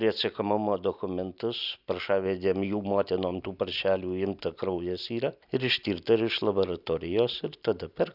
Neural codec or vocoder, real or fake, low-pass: none; real; 5.4 kHz